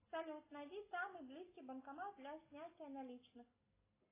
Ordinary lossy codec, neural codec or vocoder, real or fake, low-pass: AAC, 16 kbps; none; real; 3.6 kHz